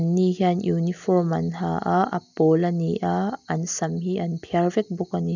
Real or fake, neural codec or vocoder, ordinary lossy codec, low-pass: real; none; none; 7.2 kHz